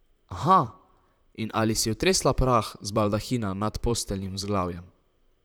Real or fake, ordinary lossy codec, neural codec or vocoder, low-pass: fake; none; vocoder, 44.1 kHz, 128 mel bands, Pupu-Vocoder; none